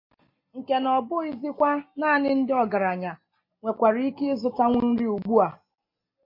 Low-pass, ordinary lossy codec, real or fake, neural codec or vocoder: 5.4 kHz; MP3, 32 kbps; real; none